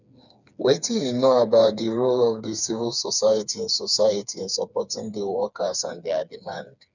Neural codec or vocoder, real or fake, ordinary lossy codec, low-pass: codec, 16 kHz, 4 kbps, FreqCodec, smaller model; fake; none; 7.2 kHz